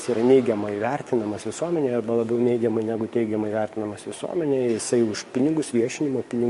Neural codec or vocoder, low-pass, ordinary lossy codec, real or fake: codec, 44.1 kHz, 7.8 kbps, DAC; 14.4 kHz; MP3, 48 kbps; fake